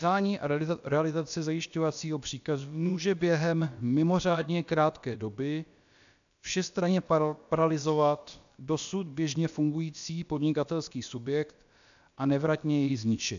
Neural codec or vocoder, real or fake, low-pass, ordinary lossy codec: codec, 16 kHz, about 1 kbps, DyCAST, with the encoder's durations; fake; 7.2 kHz; MP3, 96 kbps